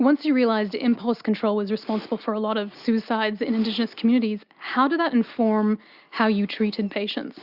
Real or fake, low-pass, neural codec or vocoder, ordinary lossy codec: real; 5.4 kHz; none; Opus, 64 kbps